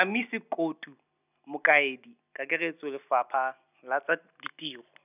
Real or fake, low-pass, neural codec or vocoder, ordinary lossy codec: real; 3.6 kHz; none; none